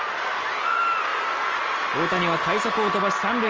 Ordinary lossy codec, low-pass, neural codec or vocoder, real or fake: Opus, 24 kbps; 7.2 kHz; none; real